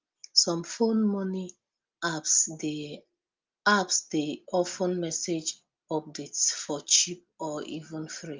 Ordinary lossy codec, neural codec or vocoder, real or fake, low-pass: Opus, 24 kbps; none; real; 7.2 kHz